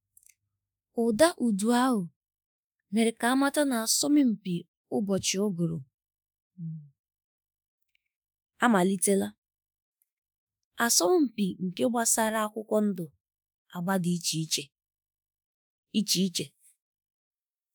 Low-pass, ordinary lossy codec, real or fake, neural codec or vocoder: none; none; fake; autoencoder, 48 kHz, 32 numbers a frame, DAC-VAE, trained on Japanese speech